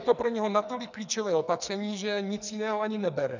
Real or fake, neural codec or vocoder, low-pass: fake; codec, 44.1 kHz, 2.6 kbps, SNAC; 7.2 kHz